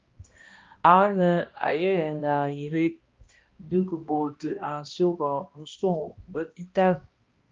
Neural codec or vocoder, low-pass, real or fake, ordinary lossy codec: codec, 16 kHz, 1 kbps, X-Codec, HuBERT features, trained on balanced general audio; 7.2 kHz; fake; Opus, 32 kbps